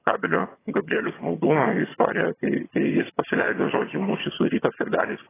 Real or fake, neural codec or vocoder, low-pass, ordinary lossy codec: fake; vocoder, 22.05 kHz, 80 mel bands, HiFi-GAN; 3.6 kHz; AAC, 16 kbps